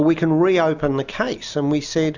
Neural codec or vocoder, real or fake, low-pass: none; real; 7.2 kHz